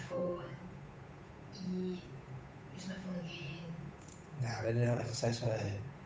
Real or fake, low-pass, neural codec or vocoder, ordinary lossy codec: fake; none; codec, 16 kHz, 8 kbps, FunCodec, trained on Chinese and English, 25 frames a second; none